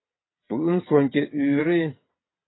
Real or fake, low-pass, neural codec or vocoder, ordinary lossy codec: fake; 7.2 kHz; vocoder, 22.05 kHz, 80 mel bands, WaveNeXt; AAC, 16 kbps